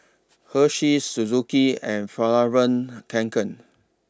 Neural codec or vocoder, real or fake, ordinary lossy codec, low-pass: none; real; none; none